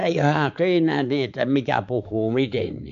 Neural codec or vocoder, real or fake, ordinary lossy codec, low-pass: codec, 16 kHz, 4 kbps, X-Codec, HuBERT features, trained on balanced general audio; fake; none; 7.2 kHz